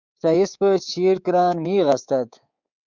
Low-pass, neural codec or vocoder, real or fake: 7.2 kHz; codec, 24 kHz, 3.1 kbps, DualCodec; fake